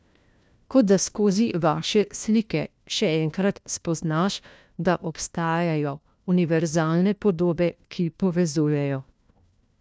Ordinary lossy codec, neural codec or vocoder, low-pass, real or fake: none; codec, 16 kHz, 1 kbps, FunCodec, trained on LibriTTS, 50 frames a second; none; fake